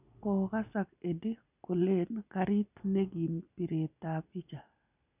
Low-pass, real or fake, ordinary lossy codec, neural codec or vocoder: 3.6 kHz; real; none; none